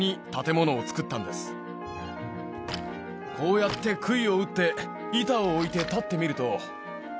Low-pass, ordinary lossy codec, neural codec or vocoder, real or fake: none; none; none; real